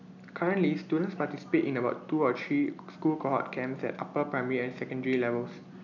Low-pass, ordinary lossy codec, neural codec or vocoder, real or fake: 7.2 kHz; none; none; real